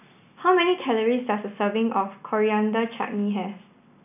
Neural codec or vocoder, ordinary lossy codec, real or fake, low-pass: none; none; real; 3.6 kHz